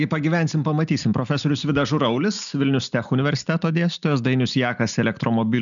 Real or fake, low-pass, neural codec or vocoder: real; 7.2 kHz; none